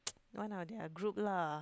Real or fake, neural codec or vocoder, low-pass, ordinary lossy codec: real; none; none; none